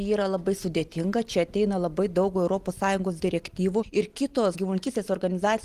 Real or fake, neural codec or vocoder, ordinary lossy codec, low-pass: real; none; Opus, 24 kbps; 14.4 kHz